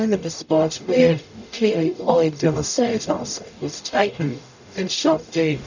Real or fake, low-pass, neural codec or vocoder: fake; 7.2 kHz; codec, 44.1 kHz, 0.9 kbps, DAC